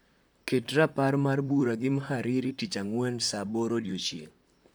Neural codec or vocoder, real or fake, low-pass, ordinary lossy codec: vocoder, 44.1 kHz, 128 mel bands, Pupu-Vocoder; fake; none; none